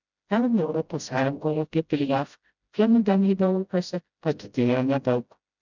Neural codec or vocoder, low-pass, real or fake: codec, 16 kHz, 0.5 kbps, FreqCodec, smaller model; 7.2 kHz; fake